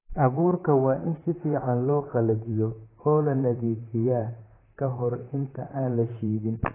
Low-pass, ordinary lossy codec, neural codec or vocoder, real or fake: 3.6 kHz; AAC, 16 kbps; vocoder, 44.1 kHz, 128 mel bands, Pupu-Vocoder; fake